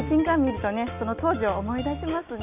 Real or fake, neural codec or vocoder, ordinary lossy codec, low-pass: real; none; none; 3.6 kHz